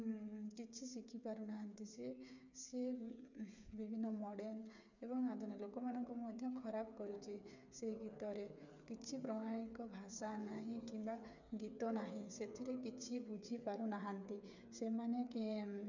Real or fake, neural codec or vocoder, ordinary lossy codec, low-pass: fake; codec, 16 kHz, 8 kbps, FreqCodec, smaller model; none; 7.2 kHz